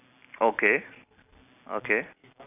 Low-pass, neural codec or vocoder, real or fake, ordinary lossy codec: 3.6 kHz; none; real; none